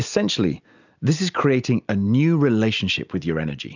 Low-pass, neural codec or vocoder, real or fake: 7.2 kHz; none; real